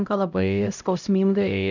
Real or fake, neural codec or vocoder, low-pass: fake; codec, 16 kHz, 0.5 kbps, X-Codec, HuBERT features, trained on LibriSpeech; 7.2 kHz